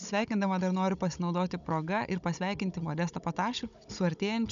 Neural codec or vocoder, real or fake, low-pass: codec, 16 kHz, 16 kbps, FunCodec, trained on Chinese and English, 50 frames a second; fake; 7.2 kHz